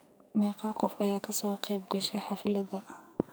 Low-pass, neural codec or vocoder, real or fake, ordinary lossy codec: none; codec, 44.1 kHz, 2.6 kbps, SNAC; fake; none